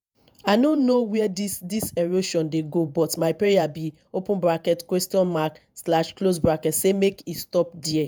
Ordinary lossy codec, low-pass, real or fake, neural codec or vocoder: none; none; fake; vocoder, 48 kHz, 128 mel bands, Vocos